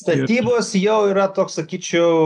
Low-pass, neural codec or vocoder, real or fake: 10.8 kHz; none; real